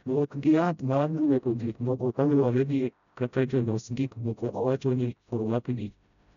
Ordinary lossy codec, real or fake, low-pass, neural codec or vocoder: none; fake; 7.2 kHz; codec, 16 kHz, 0.5 kbps, FreqCodec, smaller model